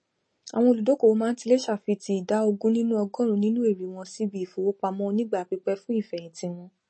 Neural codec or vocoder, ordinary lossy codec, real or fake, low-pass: none; MP3, 32 kbps; real; 9.9 kHz